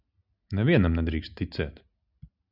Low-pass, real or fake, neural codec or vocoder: 5.4 kHz; real; none